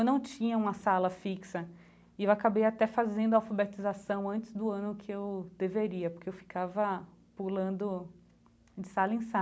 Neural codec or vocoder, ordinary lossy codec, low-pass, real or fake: none; none; none; real